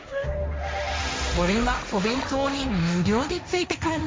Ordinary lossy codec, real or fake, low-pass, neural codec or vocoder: none; fake; none; codec, 16 kHz, 1.1 kbps, Voila-Tokenizer